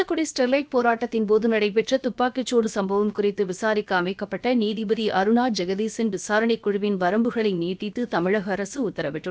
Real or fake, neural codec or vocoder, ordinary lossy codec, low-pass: fake; codec, 16 kHz, about 1 kbps, DyCAST, with the encoder's durations; none; none